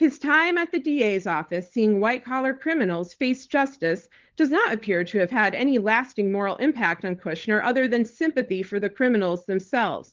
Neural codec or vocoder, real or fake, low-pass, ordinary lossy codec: codec, 16 kHz, 16 kbps, FunCodec, trained on LibriTTS, 50 frames a second; fake; 7.2 kHz; Opus, 16 kbps